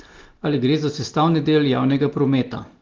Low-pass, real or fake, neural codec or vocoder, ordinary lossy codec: 7.2 kHz; real; none; Opus, 16 kbps